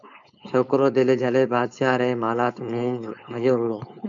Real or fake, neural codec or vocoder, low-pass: fake; codec, 16 kHz, 4.8 kbps, FACodec; 7.2 kHz